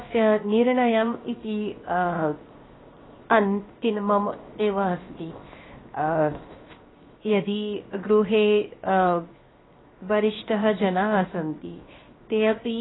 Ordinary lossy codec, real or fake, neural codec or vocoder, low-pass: AAC, 16 kbps; fake; codec, 16 kHz, 0.7 kbps, FocalCodec; 7.2 kHz